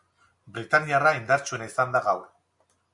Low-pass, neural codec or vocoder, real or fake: 10.8 kHz; none; real